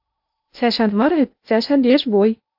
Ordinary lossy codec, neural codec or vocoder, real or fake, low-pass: AAC, 48 kbps; codec, 16 kHz in and 24 kHz out, 0.8 kbps, FocalCodec, streaming, 65536 codes; fake; 5.4 kHz